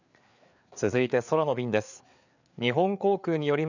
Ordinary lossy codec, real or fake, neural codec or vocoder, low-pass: none; fake; codec, 16 kHz, 4 kbps, FreqCodec, larger model; 7.2 kHz